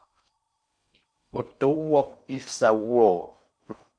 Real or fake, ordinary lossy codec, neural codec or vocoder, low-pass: fake; MP3, 64 kbps; codec, 16 kHz in and 24 kHz out, 0.6 kbps, FocalCodec, streaming, 4096 codes; 9.9 kHz